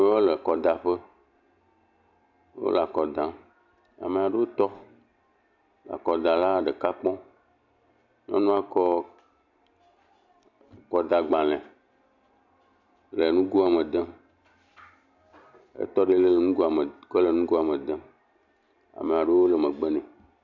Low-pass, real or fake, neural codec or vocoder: 7.2 kHz; real; none